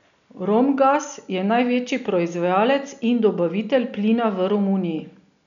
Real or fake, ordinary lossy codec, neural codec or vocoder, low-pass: real; none; none; 7.2 kHz